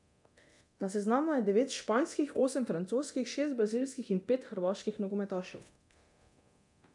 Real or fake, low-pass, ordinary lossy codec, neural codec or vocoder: fake; 10.8 kHz; none; codec, 24 kHz, 0.9 kbps, DualCodec